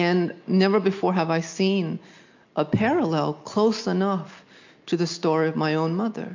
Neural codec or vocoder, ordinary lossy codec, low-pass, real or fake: none; MP3, 48 kbps; 7.2 kHz; real